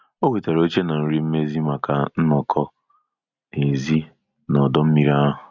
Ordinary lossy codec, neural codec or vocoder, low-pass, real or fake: none; none; 7.2 kHz; real